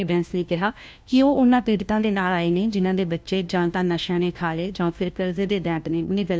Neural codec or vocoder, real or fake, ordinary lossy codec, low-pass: codec, 16 kHz, 1 kbps, FunCodec, trained on LibriTTS, 50 frames a second; fake; none; none